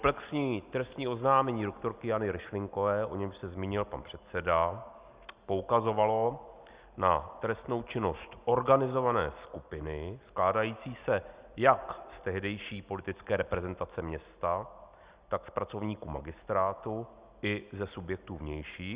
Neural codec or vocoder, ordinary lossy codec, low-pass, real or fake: none; Opus, 64 kbps; 3.6 kHz; real